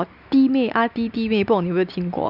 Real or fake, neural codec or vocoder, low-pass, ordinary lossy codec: real; none; 5.4 kHz; none